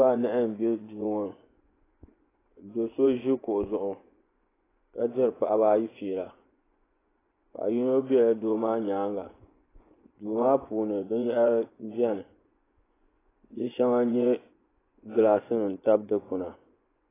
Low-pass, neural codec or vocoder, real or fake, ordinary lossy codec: 3.6 kHz; vocoder, 24 kHz, 100 mel bands, Vocos; fake; AAC, 16 kbps